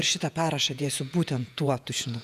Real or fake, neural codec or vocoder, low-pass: fake; vocoder, 48 kHz, 128 mel bands, Vocos; 14.4 kHz